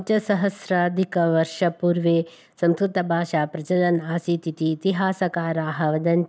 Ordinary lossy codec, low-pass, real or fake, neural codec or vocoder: none; none; real; none